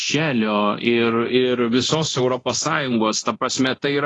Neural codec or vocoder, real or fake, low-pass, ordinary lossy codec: codec, 24 kHz, 0.9 kbps, DualCodec; fake; 10.8 kHz; AAC, 32 kbps